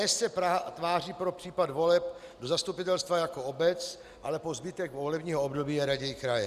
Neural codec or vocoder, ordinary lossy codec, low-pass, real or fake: none; Opus, 64 kbps; 14.4 kHz; real